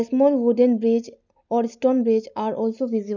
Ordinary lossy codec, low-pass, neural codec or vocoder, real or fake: none; 7.2 kHz; none; real